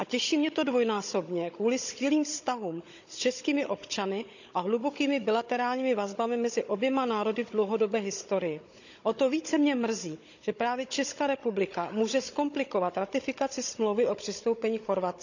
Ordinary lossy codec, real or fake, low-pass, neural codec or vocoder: none; fake; 7.2 kHz; codec, 16 kHz, 16 kbps, FunCodec, trained on Chinese and English, 50 frames a second